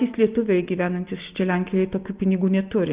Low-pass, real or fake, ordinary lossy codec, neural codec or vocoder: 3.6 kHz; real; Opus, 32 kbps; none